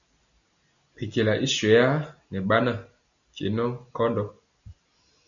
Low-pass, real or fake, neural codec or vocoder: 7.2 kHz; real; none